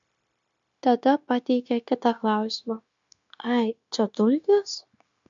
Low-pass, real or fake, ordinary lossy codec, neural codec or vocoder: 7.2 kHz; fake; MP3, 64 kbps; codec, 16 kHz, 0.9 kbps, LongCat-Audio-Codec